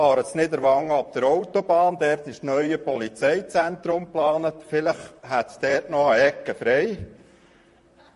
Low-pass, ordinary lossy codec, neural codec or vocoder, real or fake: 14.4 kHz; MP3, 48 kbps; vocoder, 44.1 kHz, 128 mel bands, Pupu-Vocoder; fake